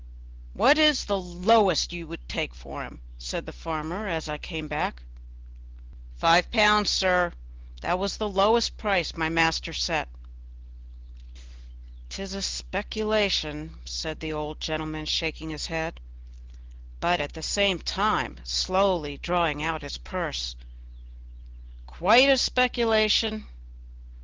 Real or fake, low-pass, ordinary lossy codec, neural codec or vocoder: real; 7.2 kHz; Opus, 16 kbps; none